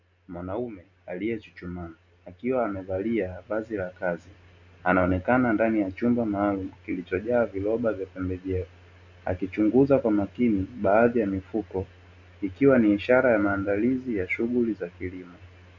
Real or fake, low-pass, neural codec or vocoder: real; 7.2 kHz; none